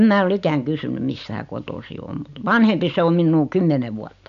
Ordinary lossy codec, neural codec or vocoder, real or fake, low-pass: none; none; real; 7.2 kHz